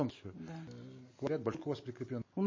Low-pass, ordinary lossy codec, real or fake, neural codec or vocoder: 7.2 kHz; MP3, 32 kbps; real; none